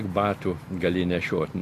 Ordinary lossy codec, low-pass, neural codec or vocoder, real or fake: AAC, 64 kbps; 14.4 kHz; none; real